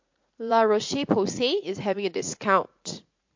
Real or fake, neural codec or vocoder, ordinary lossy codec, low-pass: fake; vocoder, 44.1 kHz, 80 mel bands, Vocos; MP3, 48 kbps; 7.2 kHz